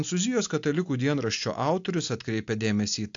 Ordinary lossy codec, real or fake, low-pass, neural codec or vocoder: AAC, 64 kbps; real; 7.2 kHz; none